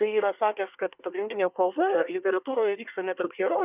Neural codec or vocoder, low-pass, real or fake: codec, 24 kHz, 1 kbps, SNAC; 3.6 kHz; fake